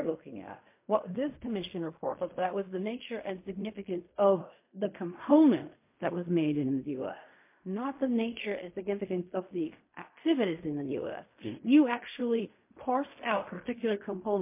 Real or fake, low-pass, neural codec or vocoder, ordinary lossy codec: fake; 3.6 kHz; codec, 16 kHz in and 24 kHz out, 0.4 kbps, LongCat-Audio-Codec, fine tuned four codebook decoder; MP3, 24 kbps